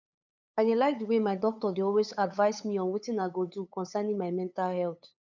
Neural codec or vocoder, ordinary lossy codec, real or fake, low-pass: codec, 16 kHz, 8 kbps, FunCodec, trained on LibriTTS, 25 frames a second; none; fake; 7.2 kHz